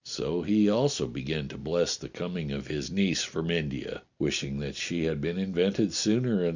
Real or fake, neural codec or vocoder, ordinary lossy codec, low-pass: real; none; Opus, 64 kbps; 7.2 kHz